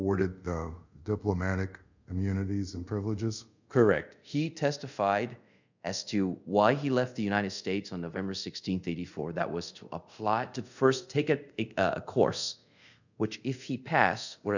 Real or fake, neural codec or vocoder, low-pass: fake; codec, 24 kHz, 0.5 kbps, DualCodec; 7.2 kHz